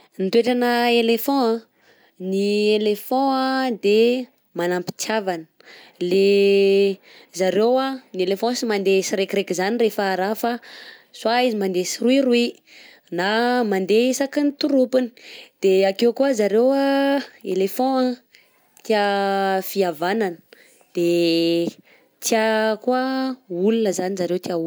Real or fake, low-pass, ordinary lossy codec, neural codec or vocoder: real; none; none; none